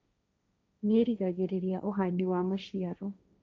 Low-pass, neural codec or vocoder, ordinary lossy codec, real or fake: none; codec, 16 kHz, 1.1 kbps, Voila-Tokenizer; none; fake